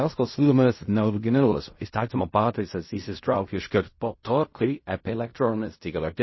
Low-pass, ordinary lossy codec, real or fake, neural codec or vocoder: 7.2 kHz; MP3, 24 kbps; fake; codec, 16 kHz in and 24 kHz out, 0.4 kbps, LongCat-Audio-Codec, fine tuned four codebook decoder